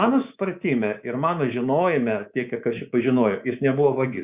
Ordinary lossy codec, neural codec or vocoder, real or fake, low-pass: Opus, 64 kbps; codec, 24 kHz, 3.1 kbps, DualCodec; fake; 3.6 kHz